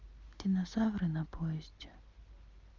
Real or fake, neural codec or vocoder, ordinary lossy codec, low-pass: real; none; Opus, 32 kbps; 7.2 kHz